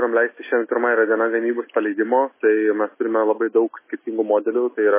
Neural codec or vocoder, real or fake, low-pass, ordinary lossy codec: none; real; 3.6 kHz; MP3, 16 kbps